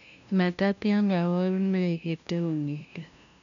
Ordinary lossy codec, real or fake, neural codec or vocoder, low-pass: none; fake; codec, 16 kHz, 0.5 kbps, FunCodec, trained on LibriTTS, 25 frames a second; 7.2 kHz